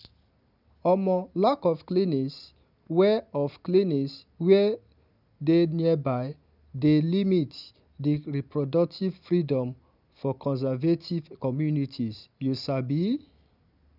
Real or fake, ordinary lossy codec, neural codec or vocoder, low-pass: real; none; none; 5.4 kHz